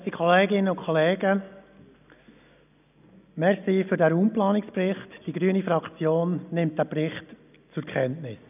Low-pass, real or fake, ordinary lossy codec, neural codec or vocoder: 3.6 kHz; real; none; none